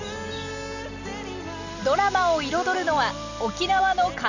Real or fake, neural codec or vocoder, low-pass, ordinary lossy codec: real; none; 7.2 kHz; none